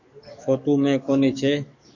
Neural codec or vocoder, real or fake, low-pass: codec, 44.1 kHz, 7.8 kbps, DAC; fake; 7.2 kHz